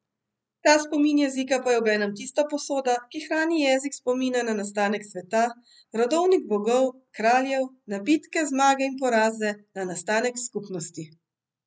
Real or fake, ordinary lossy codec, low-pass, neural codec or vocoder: real; none; none; none